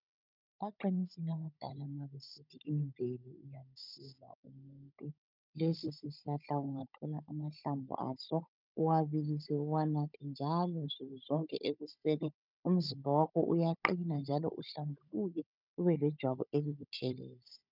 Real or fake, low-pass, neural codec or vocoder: fake; 5.4 kHz; codec, 16 kHz, 16 kbps, FunCodec, trained on Chinese and English, 50 frames a second